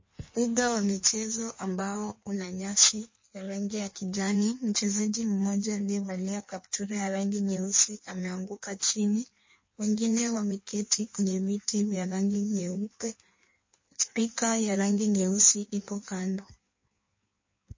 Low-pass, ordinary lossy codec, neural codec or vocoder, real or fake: 7.2 kHz; MP3, 32 kbps; codec, 16 kHz in and 24 kHz out, 1.1 kbps, FireRedTTS-2 codec; fake